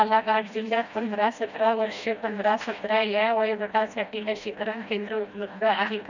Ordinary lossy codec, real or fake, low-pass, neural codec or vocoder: none; fake; 7.2 kHz; codec, 16 kHz, 1 kbps, FreqCodec, smaller model